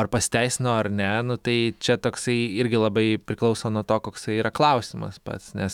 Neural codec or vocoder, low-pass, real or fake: autoencoder, 48 kHz, 128 numbers a frame, DAC-VAE, trained on Japanese speech; 19.8 kHz; fake